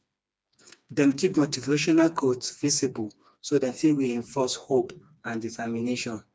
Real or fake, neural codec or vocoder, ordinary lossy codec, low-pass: fake; codec, 16 kHz, 2 kbps, FreqCodec, smaller model; none; none